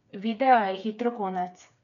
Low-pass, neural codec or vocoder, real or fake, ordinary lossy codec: 7.2 kHz; codec, 16 kHz, 4 kbps, FreqCodec, smaller model; fake; none